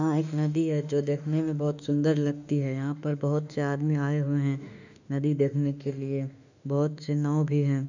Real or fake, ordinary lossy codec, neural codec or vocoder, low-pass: fake; none; autoencoder, 48 kHz, 32 numbers a frame, DAC-VAE, trained on Japanese speech; 7.2 kHz